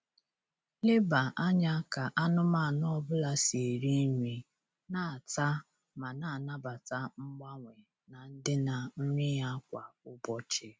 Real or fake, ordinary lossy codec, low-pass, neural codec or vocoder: real; none; none; none